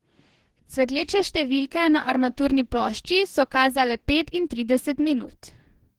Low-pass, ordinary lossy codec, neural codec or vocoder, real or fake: 19.8 kHz; Opus, 16 kbps; codec, 44.1 kHz, 2.6 kbps, DAC; fake